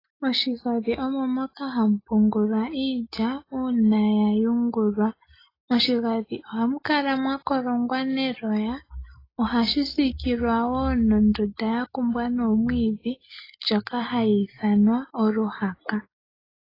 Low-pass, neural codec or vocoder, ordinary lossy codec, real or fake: 5.4 kHz; none; AAC, 24 kbps; real